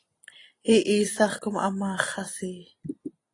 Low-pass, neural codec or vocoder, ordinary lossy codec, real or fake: 10.8 kHz; none; AAC, 32 kbps; real